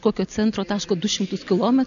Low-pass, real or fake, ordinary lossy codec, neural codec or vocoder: 7.2 kHz; real; MP3, 96 kbps; none